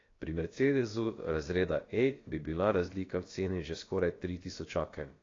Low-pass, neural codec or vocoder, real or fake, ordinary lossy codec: 7.2 kHz; codec, 16 kHz, about 1 kbps, DyCAST, with the encoder's durations; fake; AAC, 32 kbps